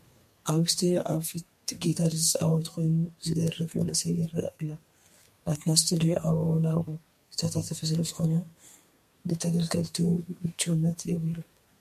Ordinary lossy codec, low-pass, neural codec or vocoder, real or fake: MP3, 64 kbps; 14.4 kHz; codec, 32 kHz, 1.9 kbps, SNAC; fake